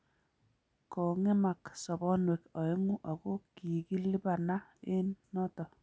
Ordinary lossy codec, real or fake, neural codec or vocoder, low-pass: none; real; none; none